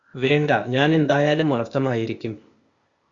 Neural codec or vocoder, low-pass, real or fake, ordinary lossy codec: codec, 16 kHz, 0.8 kbps, ZipCodec; 7.2 kHz; fake; Opus, 64 kbps